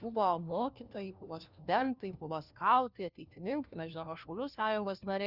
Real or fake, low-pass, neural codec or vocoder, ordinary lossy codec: fake; 5.4 kHz; codec, 16 kHz, 1 kbps, FunCodec, trained on LibriTTS, 50 frames a second; Opus, 64 kbps